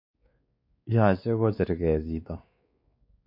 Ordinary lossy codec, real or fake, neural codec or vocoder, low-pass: MP3, 32 kbps; fake; codec, 16 kHz, 4 kbps, X-Codec, WavLM features, trained on Multilingual LibriSpeech; 5.4 kHz